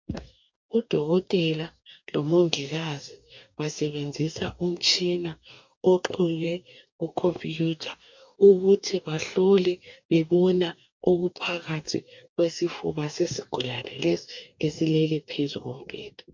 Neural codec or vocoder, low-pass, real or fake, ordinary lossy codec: codec, 44.1 kHz, 2.6 kbps, DAC; 7.2 kHz; fake; MP3, 64 kbps